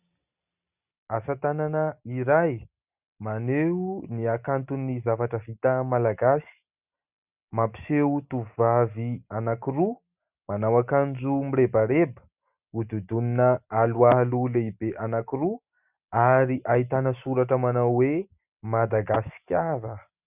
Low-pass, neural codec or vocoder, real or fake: 3.6 kHz; none; real